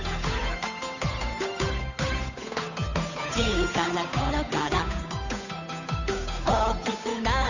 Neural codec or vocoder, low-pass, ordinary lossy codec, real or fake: codec, 16 kHz, 8 kbps, FunCodec, trained on Chinese and English, 25 frames a second; 7.2 kHz; none; fake